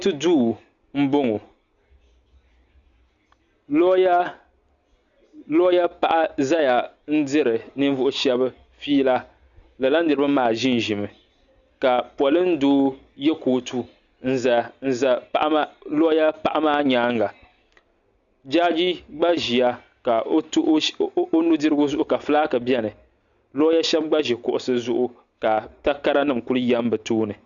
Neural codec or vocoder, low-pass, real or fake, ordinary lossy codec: none; 7.2 kHz; real; Opus, 64 kbps